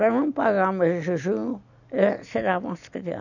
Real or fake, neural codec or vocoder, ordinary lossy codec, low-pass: real; none; none; 7.2 kHz